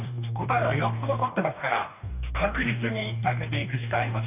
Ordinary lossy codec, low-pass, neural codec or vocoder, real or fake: none; 3.6 kHz; codec, 44.1 kHz, 2.6 kbps, DAC; fake